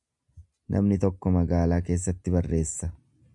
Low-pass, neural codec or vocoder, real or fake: 10.8 kHz; none; real